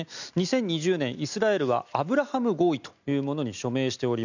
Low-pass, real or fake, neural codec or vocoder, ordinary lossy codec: 7.2 kHz; real; none; none